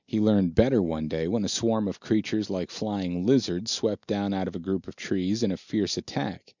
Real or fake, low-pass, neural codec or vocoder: real; 7.2 kHz; none